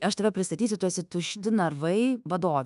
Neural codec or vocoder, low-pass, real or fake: codec, 24 kHz, 1.2 kbps, DualCodec; 10.8 kHz; fake